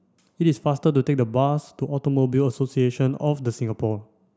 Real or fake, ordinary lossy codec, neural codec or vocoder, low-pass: real; none; none; none